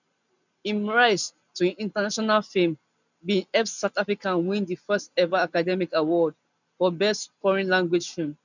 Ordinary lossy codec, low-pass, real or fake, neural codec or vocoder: none; 7.2 kHz; real; none